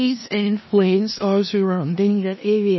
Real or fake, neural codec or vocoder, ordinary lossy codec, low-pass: fake; codec, 16 kHz in and 24 kHz out, 0.4 kbps, LongCat-Audio-Codec, four codebook decoder; MP3, 24 kbps; 7.2 kHz